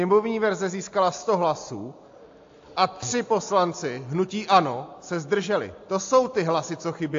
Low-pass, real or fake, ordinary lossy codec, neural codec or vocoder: 7.2 kHz; real; AAC, 48 kbps; none